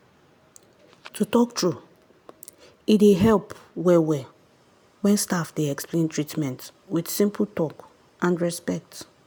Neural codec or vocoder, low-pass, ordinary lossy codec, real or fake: none; none; none; real